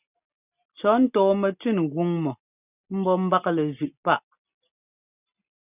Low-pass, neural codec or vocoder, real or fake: 3.6 kHz; none; real